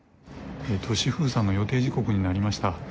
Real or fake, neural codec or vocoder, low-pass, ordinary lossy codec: real; none; none; none